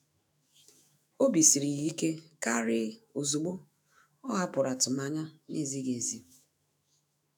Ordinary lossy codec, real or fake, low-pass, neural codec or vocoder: none; fake; none; autoencoder, 48 kHz, 128 numbers a frame, DAC-VAE, trained on Japanese speech